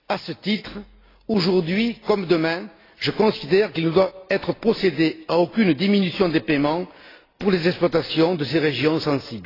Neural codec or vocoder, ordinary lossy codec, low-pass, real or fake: none; AAC, 24 kbps; 5.4 kHz; real